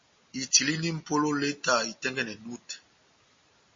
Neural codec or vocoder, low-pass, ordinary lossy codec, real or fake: none; 7.2 kHz; MP3, 32 kbps; real